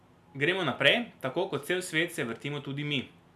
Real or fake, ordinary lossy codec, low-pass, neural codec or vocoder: real; none; 14.4 kHz; none